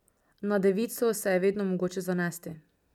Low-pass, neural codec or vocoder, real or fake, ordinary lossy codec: 19.8 kHz; none; real; none